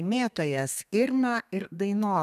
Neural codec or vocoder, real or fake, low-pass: codec, 32 kHz, 1.9 kbps, SNAC; fake; 14.4 kHz